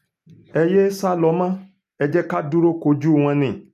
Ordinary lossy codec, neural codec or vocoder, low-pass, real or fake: none; none; 14.4 kHz; real